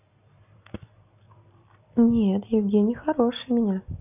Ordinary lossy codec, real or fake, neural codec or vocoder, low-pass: none; real; none; 3.6 kHz